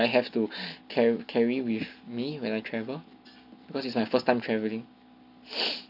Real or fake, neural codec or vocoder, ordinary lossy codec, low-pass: real; none; none; 5.4 kHz